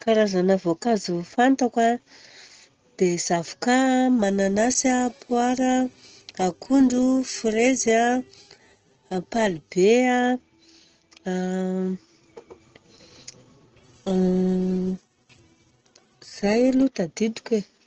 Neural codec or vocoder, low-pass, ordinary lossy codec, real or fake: none; 7.2 kHz; Opus, 16 kbps; real